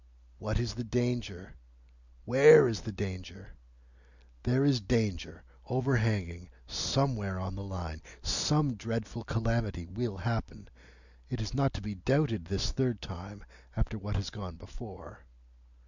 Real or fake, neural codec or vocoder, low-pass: real; none; 7.2 kHz